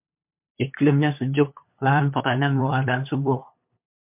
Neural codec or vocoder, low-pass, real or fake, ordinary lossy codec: codec, 16 kHz, 8 kbps, FunCodec, trained on LibriTTS, 25 frames a second; 3.6 kHz; fake; MP3, 32 kbps